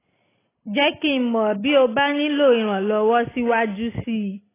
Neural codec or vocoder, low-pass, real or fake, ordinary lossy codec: none; 3.6 kHz; real; AAC, 16 kbps